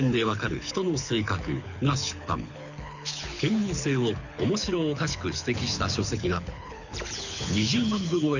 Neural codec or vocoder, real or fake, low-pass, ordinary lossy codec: codec, 24 kHz, 6 kbps, HILCodec; fake; 7.2 kHz; none